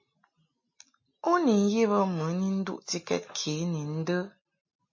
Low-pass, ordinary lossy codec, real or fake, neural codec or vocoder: 7.2 kHz; MP3, 32 kbps; real; none